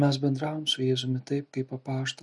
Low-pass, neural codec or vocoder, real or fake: 10.8 kHz; none; real